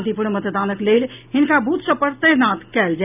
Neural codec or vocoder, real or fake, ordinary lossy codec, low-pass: none; real; none; 3.6 kHz